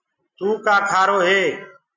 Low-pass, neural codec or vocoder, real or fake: 7.2 kHz; none; real